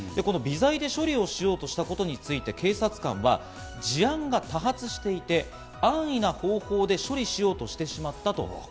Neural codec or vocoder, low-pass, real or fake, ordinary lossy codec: none; none; real; none